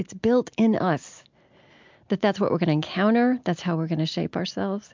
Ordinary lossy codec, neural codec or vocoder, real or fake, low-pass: MP3, 64 kbps; none; real; 7.2 kHz